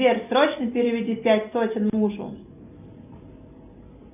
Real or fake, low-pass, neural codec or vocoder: real; 3.6 kHz; none